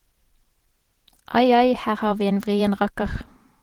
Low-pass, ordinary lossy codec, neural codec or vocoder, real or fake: 19.8 kHz; Opus, 16 kbps; vocoder, 44.1 kHz, 128 mel bands every 512 samples, BigVGAN v2; fake